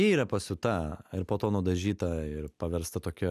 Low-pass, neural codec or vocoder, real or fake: 14.4 kHz; none; real